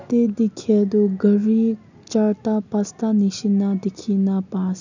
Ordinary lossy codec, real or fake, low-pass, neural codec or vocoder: none; real; 7.2 kHz; none